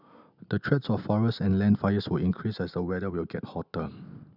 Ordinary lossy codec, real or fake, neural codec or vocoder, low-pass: none; real; none; 5.4 kHz